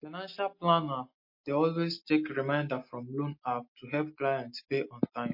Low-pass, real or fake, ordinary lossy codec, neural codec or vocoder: 5.4 kHz; real; MP3, 32 kbps; none